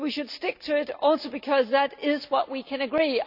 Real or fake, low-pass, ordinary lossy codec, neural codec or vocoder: real; 5.4 kHz; none; none